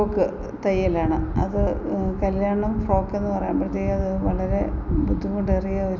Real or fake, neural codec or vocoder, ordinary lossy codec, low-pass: real; none; none; 7.2 kHz